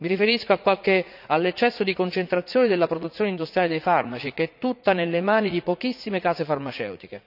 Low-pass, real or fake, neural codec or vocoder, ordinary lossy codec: 5.4 kHz; fake; vocoder, 44.1 kHz, 80 mel bands, Vocos; none